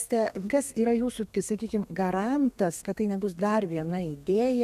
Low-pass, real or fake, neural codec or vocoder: 14.4 kHz; fake; codec, 32 kHz, 1.9 kbps, SNAC